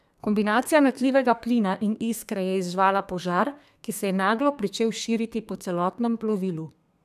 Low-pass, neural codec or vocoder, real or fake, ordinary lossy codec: 14.4 kHz; codec, 32 kHz, 1.9 kbps, SNAC; fake; none